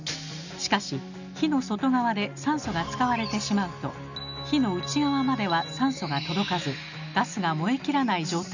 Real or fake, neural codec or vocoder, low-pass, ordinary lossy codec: real; none; 7.2 kHz; none